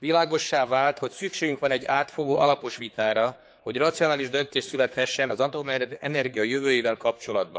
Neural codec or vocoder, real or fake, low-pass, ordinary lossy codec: codec, 16 kHz, 4 kbps, X-Codec, HuBERT features, trained on general audio; fake; none; none